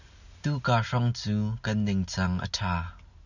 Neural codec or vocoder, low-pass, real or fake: none; 7.2 kHz; real